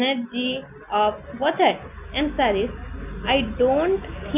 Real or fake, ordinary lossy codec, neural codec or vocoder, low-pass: real; none; none; 3.6 kHz